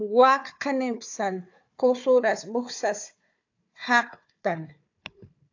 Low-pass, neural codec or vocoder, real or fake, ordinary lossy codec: 7.2 kHz; codec, 16 kHz, 4 kbps, FunCodec, trained on Chinese and English, 50 frames a second; fake; AAC, 48 kbps